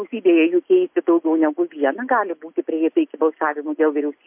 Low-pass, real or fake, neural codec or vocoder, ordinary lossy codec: 3.6 kHz; real; none; MP3, 32 kbps